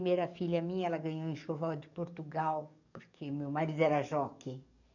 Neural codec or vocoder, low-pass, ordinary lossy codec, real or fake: codec, 44.1 kHz, 7.8 kbps, DAC; 7.2 kHz; none; fake